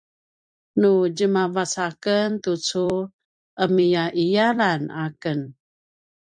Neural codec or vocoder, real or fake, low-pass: vocoder, 44.1 kHz, 128 mel bands every 256 samples, BigVGAN v2; fake; 9.9 kHz